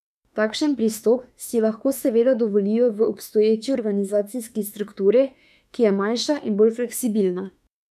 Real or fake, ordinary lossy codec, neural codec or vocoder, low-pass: fake; none; autoencoder, 48 kHz, 32 numbers a frame, DAC-VAE, trained on Japanese speech; 14.4 kHz